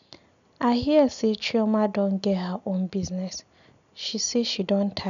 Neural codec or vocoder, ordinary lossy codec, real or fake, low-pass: none; MP3, 96 kbps; real; 7.2 kHz